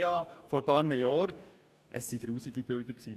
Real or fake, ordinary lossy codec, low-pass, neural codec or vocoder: fake; none; 14.4 kHz; codec, 44.1 kHz, 2.6 kbps, DAC